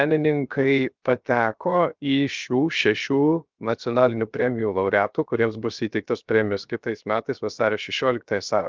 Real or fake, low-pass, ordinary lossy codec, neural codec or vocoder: fake; 7.2 kHz; Opus, 24 kbps; codec, 16 kHz, 0.7 kbps, FocalCodec